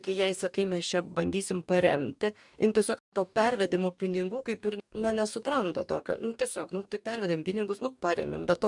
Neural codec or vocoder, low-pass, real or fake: codec, 44.1 kHz, 2.6 kbps, DAC; 10.8 kHz; fake